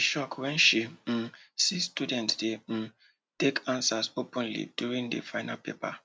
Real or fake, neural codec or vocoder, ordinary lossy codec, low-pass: real; none; none; none